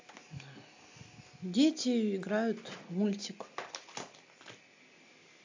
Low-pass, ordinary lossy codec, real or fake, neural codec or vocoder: 7.2 kHz; AAC, 48 kbps; real; none